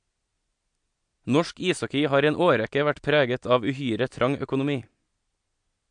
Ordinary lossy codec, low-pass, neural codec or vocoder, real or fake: MP3, 64 kbps; 9.9 kHz; none; real